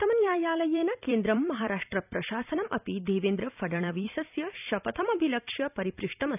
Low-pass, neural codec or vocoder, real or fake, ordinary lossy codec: 3.6 kHz; vocoder, 44.1 kHz, 128 mel bands every 256 samples, BigVGAN v2; fake; none